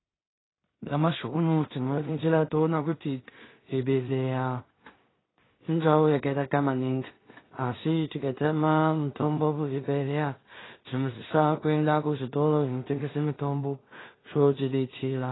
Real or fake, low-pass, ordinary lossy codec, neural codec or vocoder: fake; 7.2 kHz; AAC, 16 kbps; codec, 16 kHz in and 24 kHz out, 0.4 kbps, LongCat-Audio-Codec, two codebook decoder